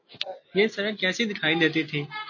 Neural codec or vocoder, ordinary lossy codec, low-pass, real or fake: none; MP3, 32 kbps; 7.2 kHz; real